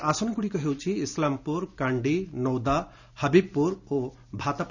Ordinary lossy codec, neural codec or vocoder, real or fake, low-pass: none; none; real; 7.2 kHz